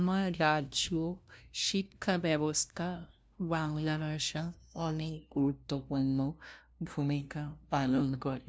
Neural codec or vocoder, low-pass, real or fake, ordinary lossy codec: codec, 16 kHz, 0.5 kbps, FunCodec, trained on LibriTTS, 25 frames a second; none; fake; none